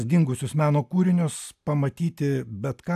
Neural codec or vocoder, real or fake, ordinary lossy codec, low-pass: none; real; AAC, 96 kbps; 14.4 kHz